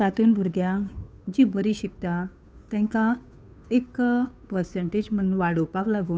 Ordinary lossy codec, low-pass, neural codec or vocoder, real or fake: none; none; codec, 16 kHz, 2 kbps, FunCodec, trained on Chinese and English, 25 frames a second; fake